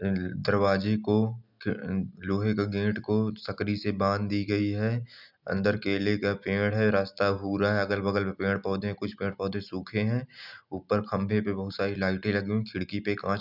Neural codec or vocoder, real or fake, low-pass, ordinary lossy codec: none; real; 5.4 kHz; none